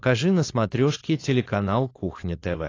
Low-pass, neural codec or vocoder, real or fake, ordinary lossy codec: 7.2 kHz; codec, 16 kHz, 4.8 kbps, FACodec; fake; AAC, 32 kbps